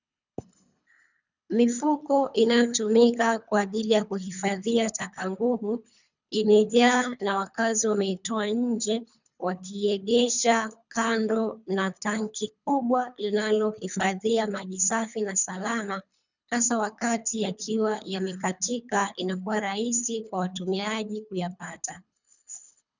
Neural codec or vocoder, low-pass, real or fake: codec, 24 kHz, 3 kbps, HILCodec; 7.2 kHz; fake